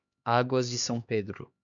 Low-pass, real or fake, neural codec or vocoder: 7.2 kHz; fake; codec, 16 kHz, 2 kbps, X-Codec, HuBERT features, trained on LibriSpeech